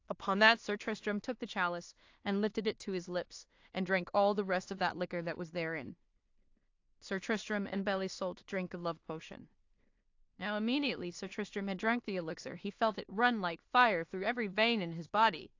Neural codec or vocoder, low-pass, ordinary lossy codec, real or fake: codec, 16 kHz in and 24 kHz out, 0.4 kbps, LongCat-Audio-Codec, two codebook decoder; 7.2 kHz; AAC, 48 kbps; fake